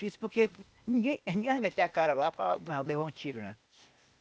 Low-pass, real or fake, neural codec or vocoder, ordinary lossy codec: none; fake; codec, 16 kHz, 0.8 kbps, ZipCodec; none